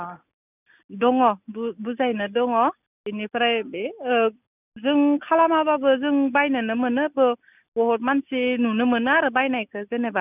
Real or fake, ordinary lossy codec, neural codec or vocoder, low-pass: real; none; none; 3.6 kHz